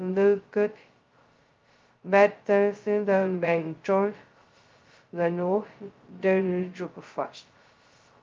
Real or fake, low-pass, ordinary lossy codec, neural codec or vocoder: fake; 7.2 kHz; Opus, 32 kbps; codec, 16 kHz, 0.2 kbps, FocalCodec